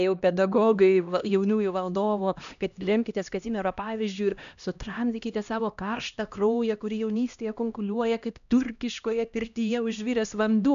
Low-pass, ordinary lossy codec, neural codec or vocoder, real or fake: 7.2 kHz; AAC, 96 kbps; codec, 16 kHz, 1 kbps, X-Codec, HuBERT features, trained on LibriSpeech; fake